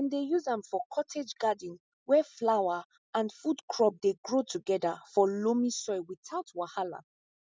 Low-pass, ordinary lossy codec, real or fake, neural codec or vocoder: none; none; real; none